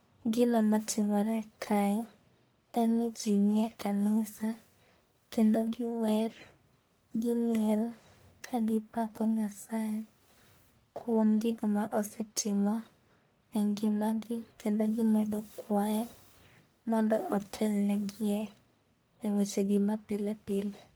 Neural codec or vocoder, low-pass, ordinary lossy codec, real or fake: codec, 44.1 kHz, 1.7 kbps, Pupu-Codec; none; none; fake